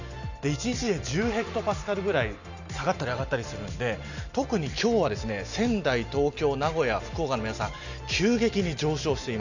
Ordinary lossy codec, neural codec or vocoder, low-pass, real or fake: none; none; 7.2 kHz; real